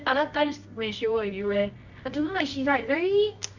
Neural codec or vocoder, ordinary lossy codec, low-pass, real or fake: codec, 24 kHz, 0.9 kbps, WavTokenizer, medium music audio release; none; 7.2 kHz; fake